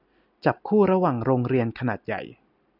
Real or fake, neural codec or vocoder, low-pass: real; none; 5.4 kHz